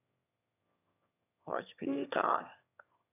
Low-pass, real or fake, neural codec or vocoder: 3.6 kHz; fake; autoencoder, 22.05 kHz, a latent of 192 numbers a frame, VITS, trained on one speaker